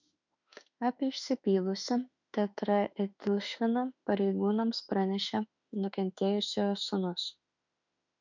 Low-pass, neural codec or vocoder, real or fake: 7.2 kHz; autoencoder, 48 kHz, 32 numbers a frame, DAC-VAE, trained on Japanese speech; fake